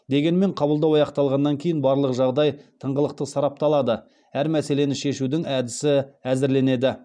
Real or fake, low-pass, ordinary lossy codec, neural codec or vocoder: real; 9.9 kHz; none; none